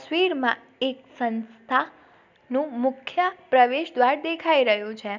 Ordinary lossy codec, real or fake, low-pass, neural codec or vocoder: none; real; 7.2 kHz; none